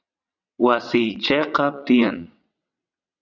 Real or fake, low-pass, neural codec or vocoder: fake; 7.2 kHz; vocoder, 22.05 kHz, 80 mel bands, WaveNeXt